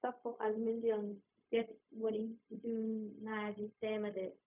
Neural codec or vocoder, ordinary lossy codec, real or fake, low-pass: codec, 16 kHz, 0.4 kbps, LongCat-Audio-Codec; none; fake; 3.6 kHz